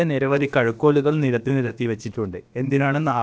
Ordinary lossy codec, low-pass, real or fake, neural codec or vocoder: none; none; fake; codec, 16 kHz, about 1 kbps, DyCAST, with the encoder's durations